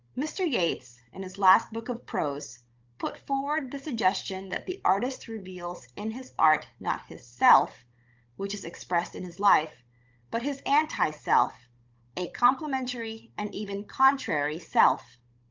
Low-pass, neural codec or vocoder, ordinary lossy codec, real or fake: 7.2 kHz; codec, 16 kHz, 16 kbps, FunCodec, trained on Chinese and English, 50 frames a second; Opus, 32 kbps; fake